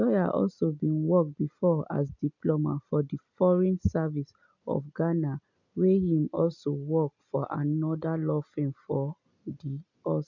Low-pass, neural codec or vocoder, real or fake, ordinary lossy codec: 7.2 kHz; none; real; none